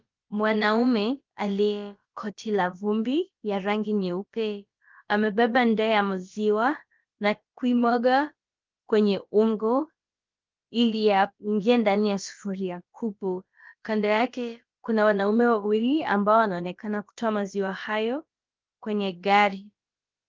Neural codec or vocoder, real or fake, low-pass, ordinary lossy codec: codec, 16 kHz, about 1 kbps, DyCAST, with the encoder's durations; fake; 7.2 kHz; Opus, 32 kbps